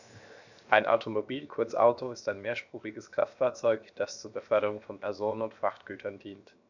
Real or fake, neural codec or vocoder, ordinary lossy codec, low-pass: fake; codec, 16 kHz, 0.7 kbps, FocalCodec; none; 7.2 kHz